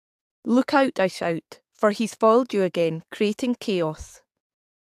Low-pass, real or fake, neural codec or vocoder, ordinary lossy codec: 14.4 kHz; fake; codec, 44.1 kHz, 7.8 kbps, DAC; AAC, 96 kbps